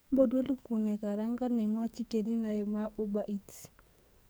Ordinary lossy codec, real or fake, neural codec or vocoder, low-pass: none; fake; codec, 44.1 kHz, 2.6 kbps, SNAC; none